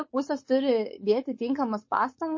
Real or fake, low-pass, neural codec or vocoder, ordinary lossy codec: fake; 7.2 kHz; codec, 16 kHz, 4.8 kbps, FACodec; MP3, 32 kbps